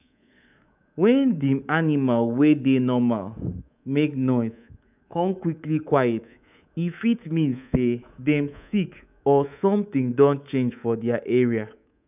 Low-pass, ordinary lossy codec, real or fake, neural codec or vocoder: 3.6 kHz; none; fake; codec, 24 kHz, 3.1 kbps, DualCodec